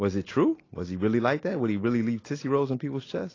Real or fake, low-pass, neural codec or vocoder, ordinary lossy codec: real; 7.2 kHz; none; AAC, 32 kbps